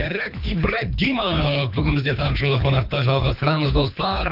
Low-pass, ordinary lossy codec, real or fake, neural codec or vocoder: 5.4 kHz; none; fake; codec, 24 kHz, 3 kbps, HILCodec